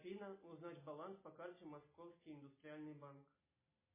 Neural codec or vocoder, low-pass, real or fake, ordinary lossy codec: none; 3.6 kHz; real; MP3, 16 kbps